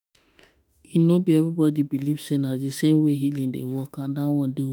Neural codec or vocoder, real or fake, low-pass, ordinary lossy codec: autoencoder, 48 kHz, 32 numbers a frame, DAC-VAE, trained on Japanese speech; fake; none; none